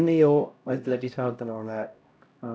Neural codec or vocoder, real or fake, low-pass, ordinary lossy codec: codec, 16 kHz, 0.5 kbps, X-Codec, HuBERT features, trained on LibriSpeech; fake; none; none